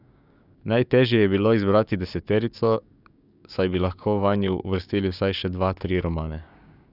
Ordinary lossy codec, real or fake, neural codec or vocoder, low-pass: none; fake; codec, 16 kHz, 6 kbps, DAC; 5.4 kHz